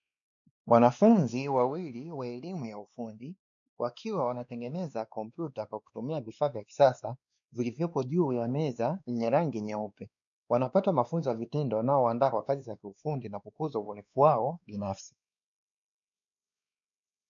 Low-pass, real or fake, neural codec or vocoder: 7.2 kHz; fake; codec, 16 kHz, 2 kbps, X-Codec, WavLM features, trained on Multilingual LibriSpeech